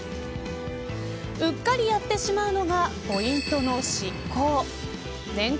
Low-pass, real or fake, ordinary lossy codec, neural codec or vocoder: none; real; none; none